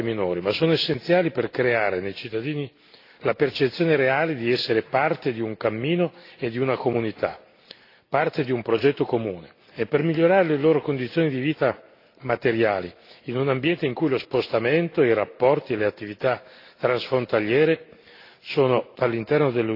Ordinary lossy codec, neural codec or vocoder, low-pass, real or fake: AAC, 32 kbps; none; 5.4 kHz; real